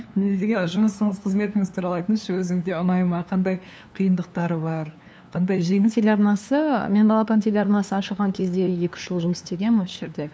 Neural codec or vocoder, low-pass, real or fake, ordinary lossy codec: codec, 16 kHz, 2 kbps, FunCodec, trained on LibriTTS, 25 frames a second; none; fake; none